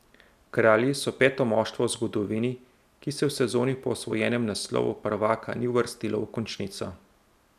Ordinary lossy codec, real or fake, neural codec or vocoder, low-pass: none; real; none; 14.4 kHz